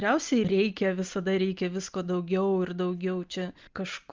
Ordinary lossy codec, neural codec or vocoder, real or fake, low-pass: Opus, 24 kbps; none; real; 7.2 kHz